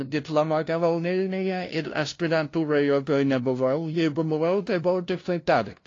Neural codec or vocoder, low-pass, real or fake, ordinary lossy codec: codec, 16 kHz, 0.5 kbps, FunCodec, trained on LibriTTS, 25 frames a second; 7.2 kHz; fake; AAC, 32 kbps